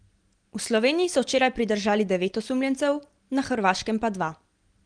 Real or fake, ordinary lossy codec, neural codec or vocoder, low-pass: real; Opus, 24 kbps; none; 9.9 kHz